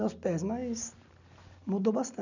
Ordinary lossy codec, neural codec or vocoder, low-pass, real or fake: none; none; 7.2 kHz; real